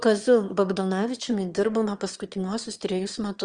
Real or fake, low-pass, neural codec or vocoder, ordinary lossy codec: fake; 9.9 kHz; autoencoder, 22.05 kHz, a latent of 192 numbers a frame, VITS, trained on one speaker; Opus, 24 kbps